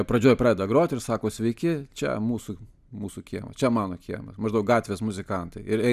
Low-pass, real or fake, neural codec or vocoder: 14.4 kHz; real; none